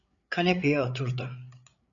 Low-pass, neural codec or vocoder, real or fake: 7.2 kHz; codec, 16 kHz, 8 kbps, FreqCodec, larger model; fake